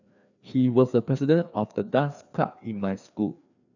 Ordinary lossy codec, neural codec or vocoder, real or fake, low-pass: none; codec, 16 kHz, 2 kbps, FreqCodec, larger model; fake; 7.2 kHz